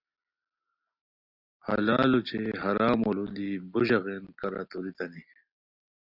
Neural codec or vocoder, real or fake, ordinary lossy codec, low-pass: none; real; Opus, 64 kbps; 5.4 kHz